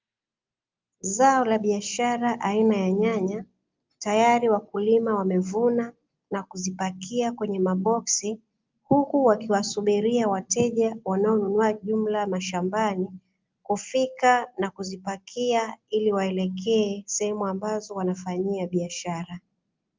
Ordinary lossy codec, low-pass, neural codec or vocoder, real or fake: Opus, 24 kbps; 7.2 kHz; none; real